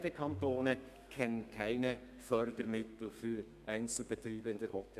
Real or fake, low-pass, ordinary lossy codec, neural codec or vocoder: fake; 14.4 kHz; none; codec, 32 kHz, 1.9 kbps, SNAC